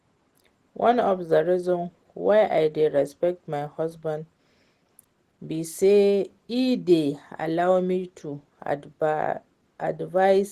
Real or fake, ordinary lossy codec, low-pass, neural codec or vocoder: real; Opus, 16 kbps; 14.4 kHz; none